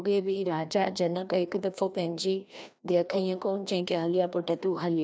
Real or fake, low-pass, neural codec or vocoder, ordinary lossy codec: fake; none; codec, 16 kHz, 1 kbps, FreqCodec, larger model; none